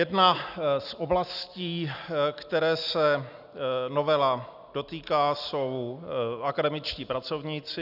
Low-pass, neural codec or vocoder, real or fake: 5.4 kHz; none; real